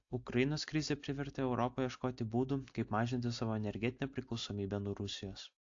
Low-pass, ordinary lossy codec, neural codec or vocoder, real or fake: 7.2 kHz; AAC, 48 kbps; none; real